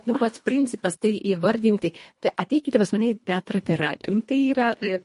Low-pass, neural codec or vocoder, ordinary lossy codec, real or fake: 10.8 kHz; codec, 24 kHz, 1.5 kbps, HILCodec; MP3, 48 kbps; fake